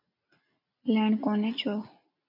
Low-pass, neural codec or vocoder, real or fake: 5.4 kHz; none; real